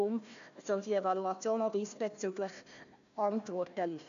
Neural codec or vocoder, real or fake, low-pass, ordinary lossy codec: codec, 16 kHz, 1 kbps, FunCodec, trained on Chinese and English, 50 frames a second; fake; 7.2 kHz; none